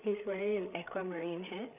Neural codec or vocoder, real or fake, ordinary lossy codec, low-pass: codec, 16 kHz, 8 kbps, FreqCodec, larger model; fake; none; 3.6 kHz